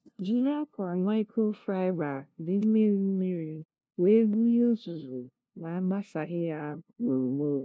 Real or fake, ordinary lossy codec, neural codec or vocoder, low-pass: fake; none; codec, 16 kHz, 0.5 kbps, FunCodec, trained on LibriTTS, 25 frames a second; none